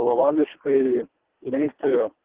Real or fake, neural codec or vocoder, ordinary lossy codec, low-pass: fake; codec, 24 kHz, 1.5 kbps, HILCodec; Opus, 16 kbps; 3.6 kHz